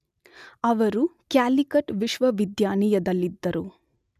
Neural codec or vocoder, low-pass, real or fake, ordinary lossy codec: none; 14.4 kHz; real; none